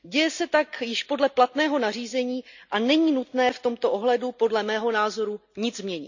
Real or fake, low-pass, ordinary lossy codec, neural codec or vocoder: real; 7.2 kHz; none; none